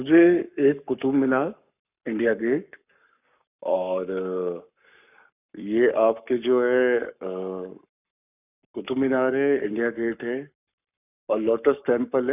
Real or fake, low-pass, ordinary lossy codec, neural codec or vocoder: fake; 3.6 kHz; none; codec, 44.1 kHz, 7.8 kbps, Pupu-Codec